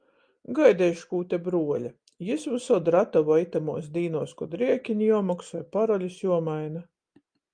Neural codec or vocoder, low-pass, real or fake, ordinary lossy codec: none; 9.9 kHz; real; Opus, 32 kbps